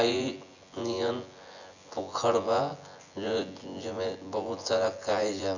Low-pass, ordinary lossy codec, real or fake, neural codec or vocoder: 7.2 kHz; none; fake; vocoder, 24 kHz, 100 mel bands, Vocos